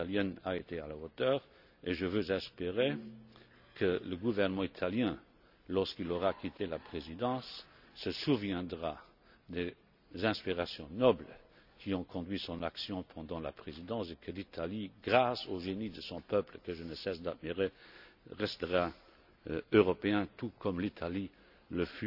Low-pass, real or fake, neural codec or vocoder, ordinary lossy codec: 5.4 kHz; real; none; none